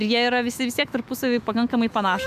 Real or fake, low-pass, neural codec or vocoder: fake; 14.4 kHz; autoencoder, 48 kHz, 128 numbers a frame, DAC-VAE, trained on Japanese speech